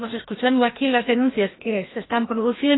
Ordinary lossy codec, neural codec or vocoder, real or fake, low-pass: AAC, 16 kbps; codec, 16 kHz, 1 kbps, FreqCodec, larger model; fake; 7.2 kHz